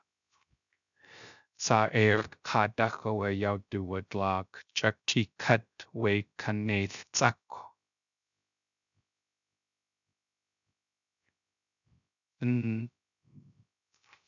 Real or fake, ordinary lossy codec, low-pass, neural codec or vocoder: fake; MP3, 96 kbps; 7.2 kHz; codec, 16 kHz, 0.3 kbps, FocalCodec